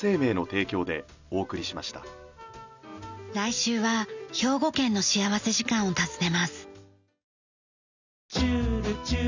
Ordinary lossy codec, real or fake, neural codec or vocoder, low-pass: none; real; none; 7.2 kHz